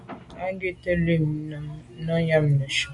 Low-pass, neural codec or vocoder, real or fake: 10.8 kHz; none; real